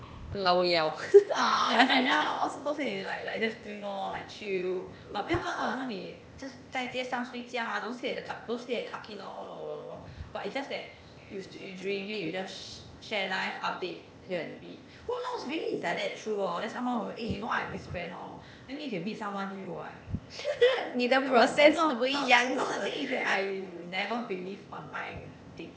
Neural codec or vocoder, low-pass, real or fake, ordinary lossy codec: codec, 16 kHz, 0.8 kbps, ZipCodec; none; fake; none